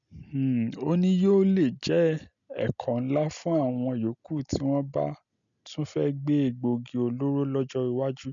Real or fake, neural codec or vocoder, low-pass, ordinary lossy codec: real; none; 7.2 kHz; none